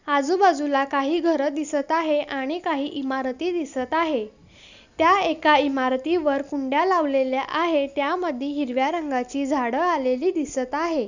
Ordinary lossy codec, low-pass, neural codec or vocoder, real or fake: none; 7.2 kHz; none; real